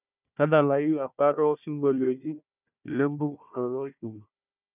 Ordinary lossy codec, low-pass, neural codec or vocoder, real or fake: none; 3.6 kHz; codec, 16 kHz, 1 kbps, FunCodec, trained on Chinese and English, 50 frames a second; fake